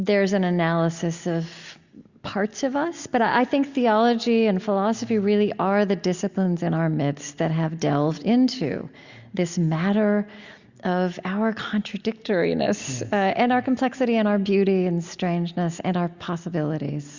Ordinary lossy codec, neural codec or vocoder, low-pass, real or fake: Opus, 64 kbps; none; 7.2 kHz; real